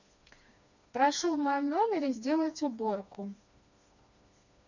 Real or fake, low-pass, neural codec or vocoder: fake; 7.2 kHz; codec, 16 kHz, 2 kbps, FreqCodec, smaller model